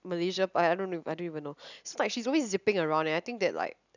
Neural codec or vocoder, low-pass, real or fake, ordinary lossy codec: none; 7.2 kHz; real; none